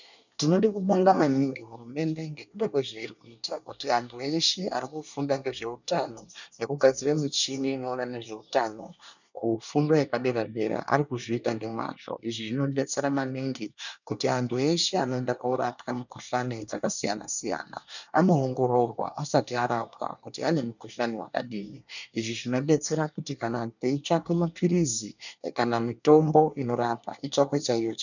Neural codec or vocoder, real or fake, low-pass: codec, 24 kHz, 1 kbps, SNAC; fake; 7.2 kHz